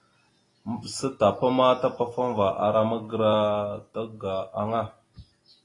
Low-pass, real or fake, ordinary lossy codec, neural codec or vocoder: 10.8 kHz; real; AAC, 32 kbps; none